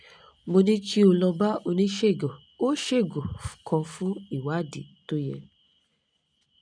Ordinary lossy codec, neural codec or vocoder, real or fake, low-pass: none; none; real; 9.9 kHz